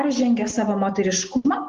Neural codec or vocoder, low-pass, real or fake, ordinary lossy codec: none; 7.2 kHz; real; Opus, 16 kbps